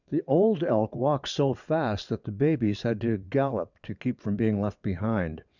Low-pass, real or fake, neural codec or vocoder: 7.2 kHz; fake; vocoder, 22.05 kHz, 80 mel bands, WaveNeXt